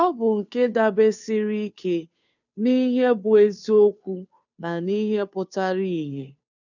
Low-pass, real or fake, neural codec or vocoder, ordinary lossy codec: 7.2 kHz; fake; codec, 16 kHz, 2 kbps, FunCodec, trained on Chinese and English, 25 frames a second; none